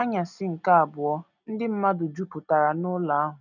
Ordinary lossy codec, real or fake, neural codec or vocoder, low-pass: none; real; none; 7.2 kHz